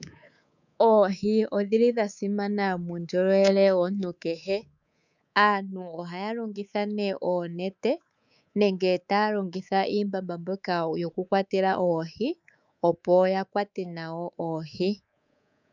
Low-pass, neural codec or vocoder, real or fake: 7.2 kHz; codec, 24 kHz, 3.1 kbps, DualCodec; fake